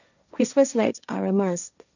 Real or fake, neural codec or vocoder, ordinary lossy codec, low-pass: fake; codec, 16 kHz, 1.1 kbps, Voila-Tokenizer; none; 7.2 kHz